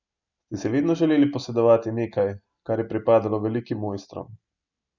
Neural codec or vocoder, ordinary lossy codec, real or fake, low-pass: none; none; real; 7.2 kHz